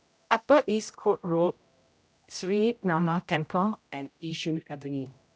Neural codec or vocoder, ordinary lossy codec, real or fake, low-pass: codec, 16 kHz, 0.5 kbps, X-Codec, HuBERT features, trained on general audio; none; fake; none